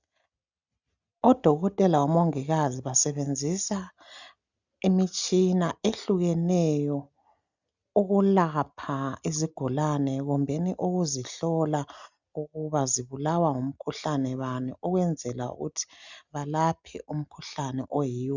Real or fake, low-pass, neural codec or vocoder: real; 7.2 kHz; none